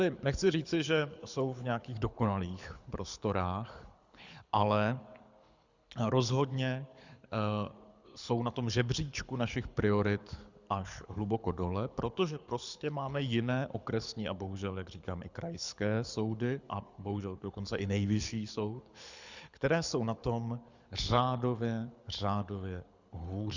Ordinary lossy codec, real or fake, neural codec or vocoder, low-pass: Opus, 64 kbps; fake; codec, 24 kHz, 6 kbps, HILCodec; 7.2 kHz